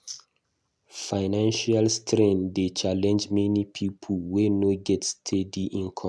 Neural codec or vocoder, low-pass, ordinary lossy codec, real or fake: none; none; none; real